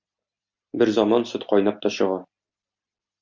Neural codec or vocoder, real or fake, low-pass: none; real; 7.2 kHz